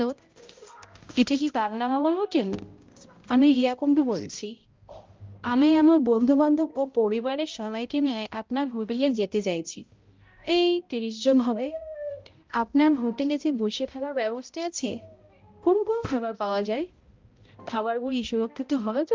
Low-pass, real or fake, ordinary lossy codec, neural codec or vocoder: 7.2 kHz; fake; Opus, 32 kbps; codec, 16 kHz, 0.5 kbps, X-Codec, HuBERT features, trained on balanced general audio